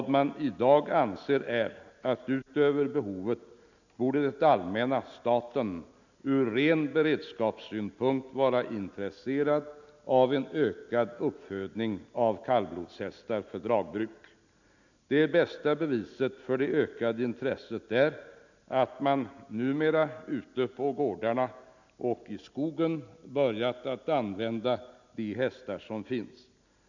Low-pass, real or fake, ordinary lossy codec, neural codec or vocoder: 7.2 kHz; real; none; none